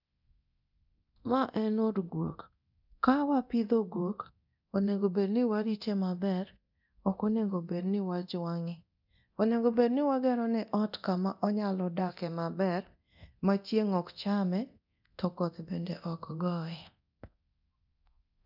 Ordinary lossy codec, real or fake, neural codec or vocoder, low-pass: none; fake; codec, 24 kHz, 0.9 kbps, DualCodec; 5.4 kHz